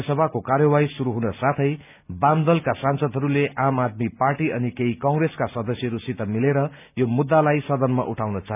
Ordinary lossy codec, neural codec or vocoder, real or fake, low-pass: none; none; real; 3.6 kHz